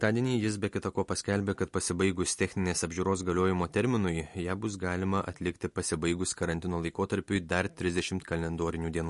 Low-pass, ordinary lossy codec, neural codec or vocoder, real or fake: 14.4 kHz; MP3, 48 kbps; none; real